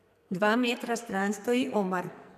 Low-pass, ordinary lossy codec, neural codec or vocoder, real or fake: 14.4 kHz; none; codec, 44.1 kHz, 2.6 kbps, SNAC; fake